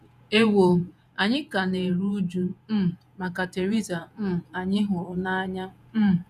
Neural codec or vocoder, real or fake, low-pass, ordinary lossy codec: vocoder, 48 kHz, 128 mel bands, Vocos; fake; 14.4 kHz; none